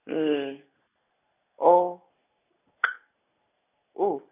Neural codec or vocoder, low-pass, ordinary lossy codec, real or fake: none; 3.6 kHz; none; real